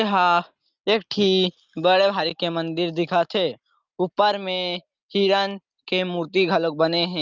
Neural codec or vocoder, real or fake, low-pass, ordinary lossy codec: none; real; 7.2 kHz; Opus, 32 kbps